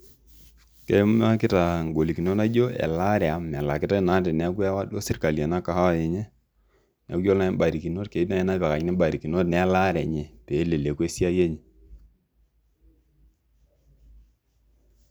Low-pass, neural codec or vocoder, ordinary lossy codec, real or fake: none; none; none; real